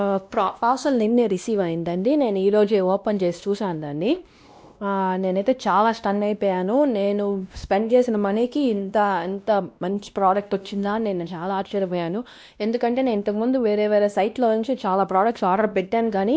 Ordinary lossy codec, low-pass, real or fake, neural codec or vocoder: none; none; fake; codec, 16 kHz, 1 kbps, X-Codec, WavLM features, trained on Multilingual LibriSpeech